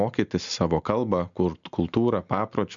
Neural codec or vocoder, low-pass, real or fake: none; 7.2 kHz; real